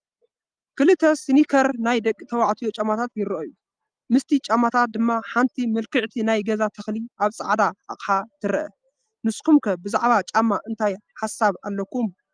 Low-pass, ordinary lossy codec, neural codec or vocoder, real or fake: 9.9 kHz; Opus, 32 kbps; none; real